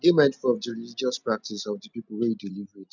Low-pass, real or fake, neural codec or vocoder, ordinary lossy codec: 7.2 kHz; real; none; none